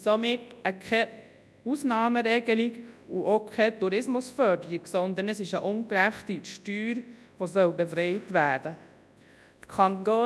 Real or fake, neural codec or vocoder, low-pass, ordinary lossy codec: fake; codec, 24 kHz, 0.9 kbps, WavTokenizer, large speech release; none; none